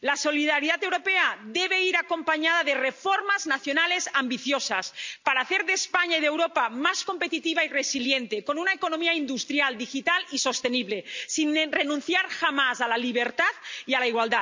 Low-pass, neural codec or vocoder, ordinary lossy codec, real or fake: 7.2 kHz; none; MP3, 64 kbps; real